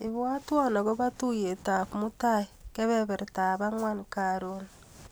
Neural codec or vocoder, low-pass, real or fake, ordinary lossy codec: none; none; real; none